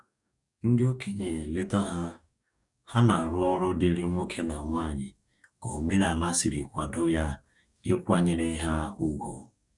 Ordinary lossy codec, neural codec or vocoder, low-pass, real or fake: none; codec, 44.1 kHz, 2.6 kbps, DAC; 10.8 kHz; fake